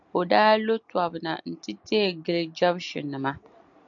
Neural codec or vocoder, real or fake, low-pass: none; real; 7.2 kHz